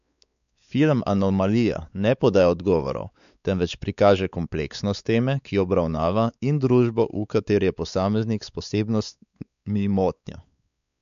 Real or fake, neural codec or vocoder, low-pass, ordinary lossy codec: fake; codec, 16 kHz, 4 kbps, X-Codec, WavLM features, trained on Multilingual LibriSpeech; 7.2 kHz; none